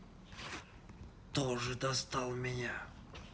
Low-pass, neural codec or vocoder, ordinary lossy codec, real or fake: none; none; none; real